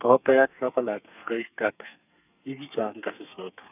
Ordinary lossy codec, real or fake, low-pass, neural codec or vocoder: none; fake; 3.6 kHz; codec, 32 kHz, 1.9 kbps, SNAC